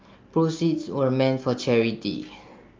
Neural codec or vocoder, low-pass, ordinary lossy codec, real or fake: none; 7.2 kHz; Opus, 24 kbps; real